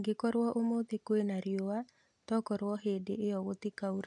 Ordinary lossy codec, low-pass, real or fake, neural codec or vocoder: none; 10.8 kHz; real; none